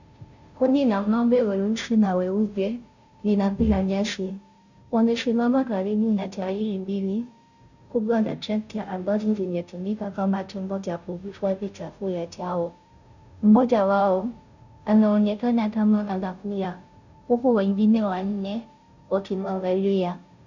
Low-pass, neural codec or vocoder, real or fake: 7.2 kHz; codec, 16 kHz, 0.5 kbps, FunCodec, trained on Chinese and English, 25 frames a second; fake